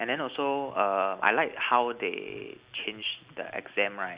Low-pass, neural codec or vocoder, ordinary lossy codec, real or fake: 3.6 kHz; none; Opus, 64 kbps; real